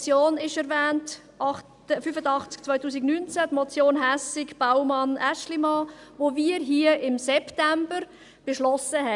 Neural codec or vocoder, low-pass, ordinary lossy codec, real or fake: none; 10.8 kHz; none; real